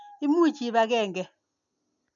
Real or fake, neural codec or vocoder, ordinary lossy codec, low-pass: real; none; none; 7.2 kHz